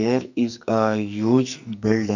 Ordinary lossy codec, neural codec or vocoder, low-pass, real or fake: none; codec, 44.1 kHz, 2.6 kbps, SNAC; 7.2 kHz; fake